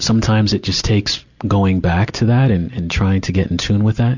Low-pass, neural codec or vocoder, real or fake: 7.2 kHz; none; real